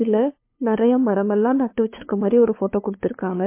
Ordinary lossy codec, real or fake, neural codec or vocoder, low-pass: MP3, 24 kbps; fake; codec, 16 kHz, 2 kbps, FunCodec, trained on LibriTTS, 25 frames a second; 3.6 kHz